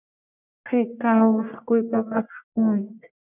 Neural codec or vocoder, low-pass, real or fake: codec, 44.1 kHz, 1.7 kbps, Pupu-Codec; 3.6 kHz; fake